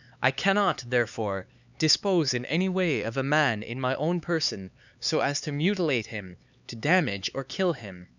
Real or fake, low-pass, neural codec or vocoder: fake; 7.2 kHz; codec, 16 kHz, 4 kbps, X-Codec, HuBERT features, trained on LibriSpeech